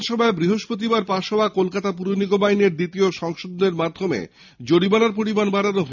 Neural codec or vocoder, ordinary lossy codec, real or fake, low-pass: none; none; real; 7.2 kHz